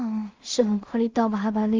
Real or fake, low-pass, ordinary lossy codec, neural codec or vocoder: fake; 7.2 kHz; Opus, 32 kbps; codec, 16 kHz in and 24 kHz out, 0.4 kbps, LongCat-Audio-Codec, two codebook decoder